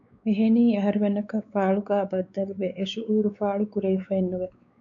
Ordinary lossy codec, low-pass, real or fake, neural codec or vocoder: MP3, 96 kbps; 7.2 kHz; fake; codec, 16 kHz, 4 kbps, X-Codec, WavLM features, trained on Multilingual LibriSpeech